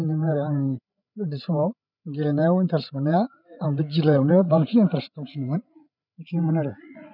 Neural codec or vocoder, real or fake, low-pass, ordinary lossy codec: codec, 16 kHz, 8 kbps, FreqCodec, larger model; fake; 5.4 kHz; none